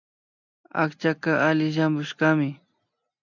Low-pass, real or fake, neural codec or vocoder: 7.2 kHz; real; none